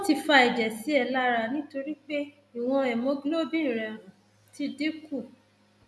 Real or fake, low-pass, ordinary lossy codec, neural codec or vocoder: real; none; none; none